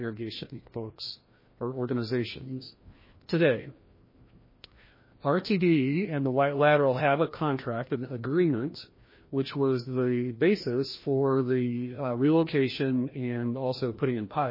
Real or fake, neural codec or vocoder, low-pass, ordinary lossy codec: fake; codec, 16 kHz, 1 kbps, FreqCodec, larger model; 5.4 kHz; MP3, 24 kbps